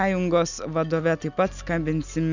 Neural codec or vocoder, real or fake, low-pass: none; real; 7.2 kHz